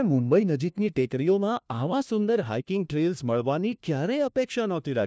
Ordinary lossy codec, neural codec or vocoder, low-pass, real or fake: none; codec, 16 kHz, 1 kbps, FunCodec, trained on LibriTTS, 50 frames a second; none; fake